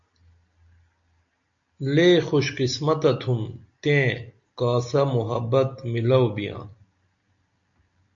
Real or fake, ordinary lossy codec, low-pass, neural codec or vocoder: real; MP3, 64 kbps; 7.2 kHz; none